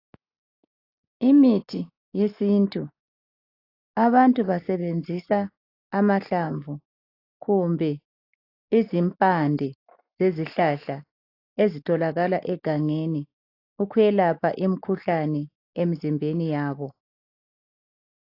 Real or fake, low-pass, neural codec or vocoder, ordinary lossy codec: real; 5.4 kHz; none; AAC, 32 kbps